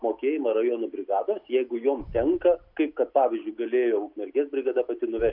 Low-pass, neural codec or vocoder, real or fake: 5.4 kHz; none; real